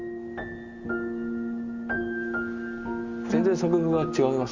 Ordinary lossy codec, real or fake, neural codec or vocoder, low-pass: Opus, 32 kbps; real; none; 7.2 kHz